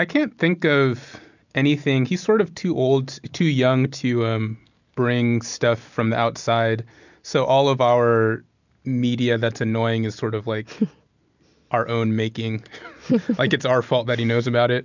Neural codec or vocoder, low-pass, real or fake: none; 7.2 kHz; real